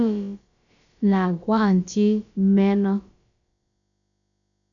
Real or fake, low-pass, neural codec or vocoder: fake; 7.2 kHz; codec, 16 kHz, about 1 kbps, DyCAST, with the encoder's durations